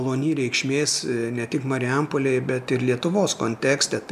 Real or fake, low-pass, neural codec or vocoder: real; 14.4 kHz; none